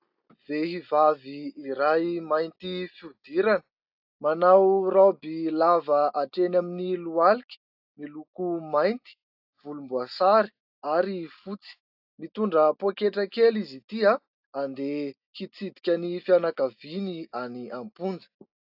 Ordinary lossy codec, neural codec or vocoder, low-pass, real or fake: AAC, 48 kbps; none; 5.4 kHz; real